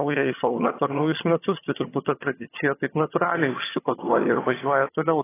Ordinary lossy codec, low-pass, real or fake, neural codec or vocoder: AAC, 16 kbps; 3.6 kHz; fake; vocoder, 22.05 kHz, 80 mel bands, HiFi-GAN